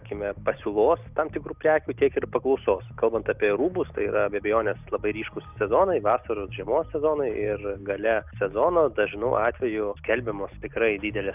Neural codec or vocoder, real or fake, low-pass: none; real; 3.6 kHz